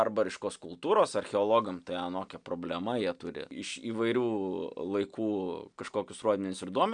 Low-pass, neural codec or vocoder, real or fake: 9.9 kHz; none; real